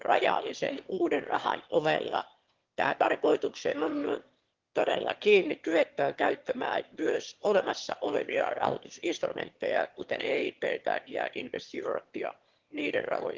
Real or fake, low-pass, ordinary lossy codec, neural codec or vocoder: fake; 7.2 kHz; Opus, 16 kbps; autoencoder, 22.05 kHz, a latent of 192 numbers a frame, VITS, trained on one speaker